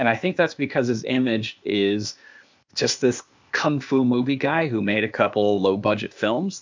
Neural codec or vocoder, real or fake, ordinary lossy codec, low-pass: codec, 16 kHz, 0.8 kbps, ZipCodec; fake; MP3, 64 kbps; 7.2 kHz